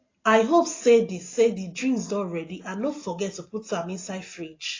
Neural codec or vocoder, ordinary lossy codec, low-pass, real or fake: none; AAC, 32 kbps; 7.2 kHz; real